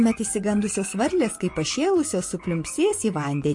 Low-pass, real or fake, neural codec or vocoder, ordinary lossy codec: 10.8 kHz; fake; vocoder, 24 kHz, 100 mel bands, Vocos; MP3, 48 kbps